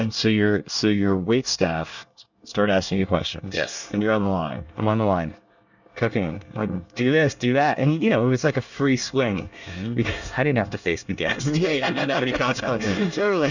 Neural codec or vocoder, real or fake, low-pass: codec, 24 kHz, 1 kbps, SNAC; fake; 7.2 kHz